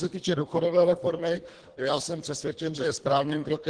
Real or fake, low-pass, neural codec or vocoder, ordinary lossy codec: fake; 9.9 kHz; codec, 24 kHz, 1.5 kbps, HILCodec; Opus, 16 kbps